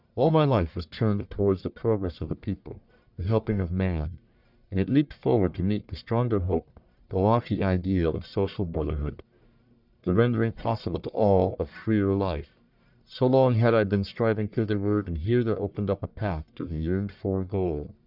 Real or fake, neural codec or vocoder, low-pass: fake; codec, 44.1 kHz, 1.7 kbps, Pupu-Codec; 5.4 kHz